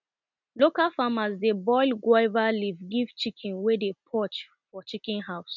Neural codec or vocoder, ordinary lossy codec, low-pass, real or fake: none; none; 7.2 kHz; real